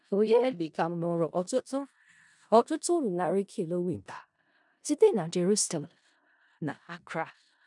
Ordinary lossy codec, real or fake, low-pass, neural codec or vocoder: none; fake; 10.8 kHz; codec, 16 kHz in and 24 kHz out, 0.4 kbps, LongCat-Audio-Codec, four codebook decoder